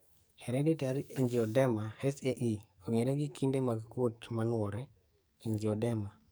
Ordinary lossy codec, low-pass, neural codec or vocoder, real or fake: none; none; codec, 44.1 kHz, 2.6 kbps, SNAC; fake